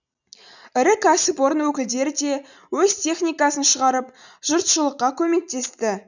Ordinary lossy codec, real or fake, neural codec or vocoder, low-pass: none; real; none; 7.2 kHz